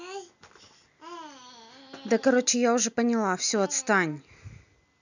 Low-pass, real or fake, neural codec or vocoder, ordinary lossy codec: 7.2 kHz; real; none; none